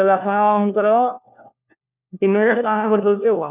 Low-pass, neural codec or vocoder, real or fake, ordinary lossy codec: 3.6 kHz; codec, 16 kHz, 1 kbps, FunCodec, trained on LibriTTS, 50 frames a second; fake; none